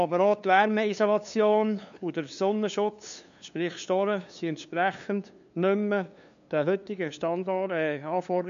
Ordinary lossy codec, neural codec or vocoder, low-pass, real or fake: MP3, 64 kbps; codec, 16 kHz, 2 kbps, FunCodec, trained on LibriTTS, 25 frames a second; 7.2 kHz; fake